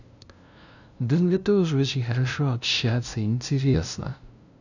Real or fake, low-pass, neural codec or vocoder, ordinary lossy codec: fake; 7.2 kHz; codec, 16 kHz, 0.5 kbps, FunCodec, trained on LibriTTS, 25 frames a second; none